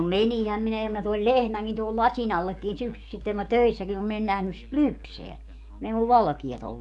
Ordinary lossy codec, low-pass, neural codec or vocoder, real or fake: none; 10.8 kHz; codec, 44.1 kHz, 7.8 kbps, DAC; fake